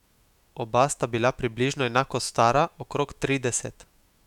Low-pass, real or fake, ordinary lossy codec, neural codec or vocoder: 19.8 kHz; fake; none; autoencoder, 48 kHz, 128 numbers a frame, DAC-VAE, trained on Japanese speech